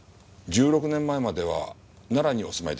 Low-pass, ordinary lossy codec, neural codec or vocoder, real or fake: none; none; none; real